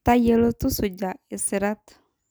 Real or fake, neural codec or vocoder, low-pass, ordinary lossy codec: real; none; none; none